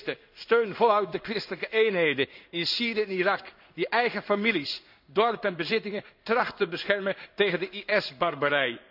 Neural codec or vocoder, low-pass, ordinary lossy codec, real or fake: codec, 16 kHz in and 24 kHz out, 1 kbps, XY-Tokenizer; 5.4 kHz; none; fake